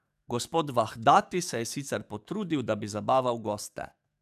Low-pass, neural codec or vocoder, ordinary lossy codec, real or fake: 14.4 kHz; codec, 44.1 kHz, 7.8 kbps, DAC; none; fake